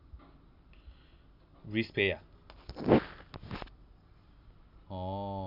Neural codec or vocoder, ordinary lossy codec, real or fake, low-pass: none; none; real; 5.4 kHz